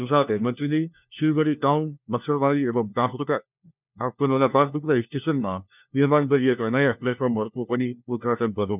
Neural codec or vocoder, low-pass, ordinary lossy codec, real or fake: codec, 16 kHz, 1 kbps, FunCodec, trained on LibriTTS, 50 frames a second; 3.6 kHz; none; fake